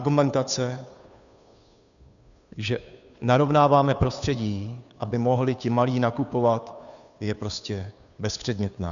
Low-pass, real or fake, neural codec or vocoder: 7.2 kHz; fake; codec, 16 kHz, 2 kbps, FunCodec, trained on Chinese and English, 25 frames a second